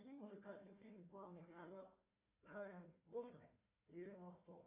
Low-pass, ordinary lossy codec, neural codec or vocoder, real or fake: 3.6 kHz; MP3, 32 kbps; codec, 16 kHz, 1 kbps, FunCodec, trained on Chinese and English, 50 frames a second; fake